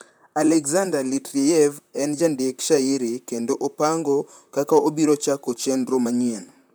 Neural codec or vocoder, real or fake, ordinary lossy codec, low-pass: vocoder, 44.1 kHz, 128 mel bands, Pupu-Vocoder; fake; none; none